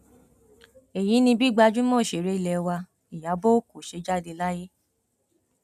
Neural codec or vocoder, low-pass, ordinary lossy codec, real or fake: none; 14.4 kHz; none; real